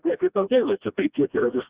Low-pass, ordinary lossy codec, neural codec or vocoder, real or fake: 3.6 kHz; Opus, 64 kbps; codec, 16 kHz, 1 kbps, FreqCodec, smaller model; fake